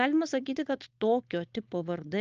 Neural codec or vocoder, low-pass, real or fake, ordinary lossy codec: codec, 16 kHz, 4.8 kbps, FACodec; 7.2 kHz; fake; Opus, 24 kbps